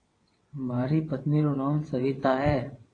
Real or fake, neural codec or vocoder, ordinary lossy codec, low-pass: fake; vocoder, 22.05 kHz, 80 mel bands, WaveNeXt; AAC, 32 kbps; 9.9 kHz